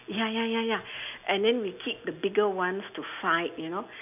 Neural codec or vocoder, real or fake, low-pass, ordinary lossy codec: none; real; 3.6 kHz; none